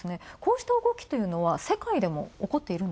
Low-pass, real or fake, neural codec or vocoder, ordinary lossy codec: none; real; none; none